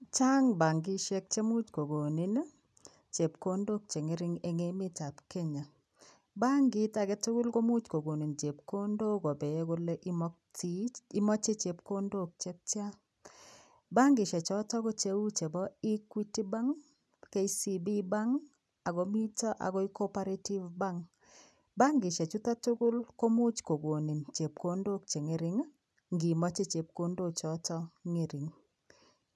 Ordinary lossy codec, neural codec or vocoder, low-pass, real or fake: none; none; none; real